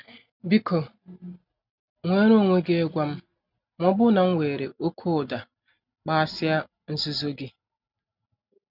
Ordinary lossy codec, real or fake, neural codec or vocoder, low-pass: none; real; none; 5.4 kHz